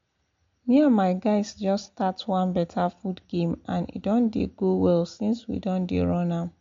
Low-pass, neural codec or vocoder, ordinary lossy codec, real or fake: 7.2 kHz; none; MP3, 48 kbps; real